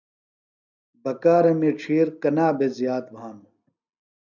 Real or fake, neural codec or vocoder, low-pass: real; none; 7.2 kHz